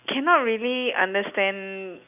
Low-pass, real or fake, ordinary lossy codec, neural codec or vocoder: 3.6 kHz; real; none; none